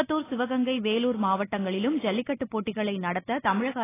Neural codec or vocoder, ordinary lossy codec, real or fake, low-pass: none; AAC, 16 kbps; real; 3.6 kHz